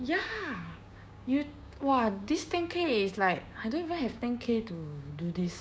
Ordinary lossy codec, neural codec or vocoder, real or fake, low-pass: none; codec, 16 kHz, 6 kbps, DAC; fake; none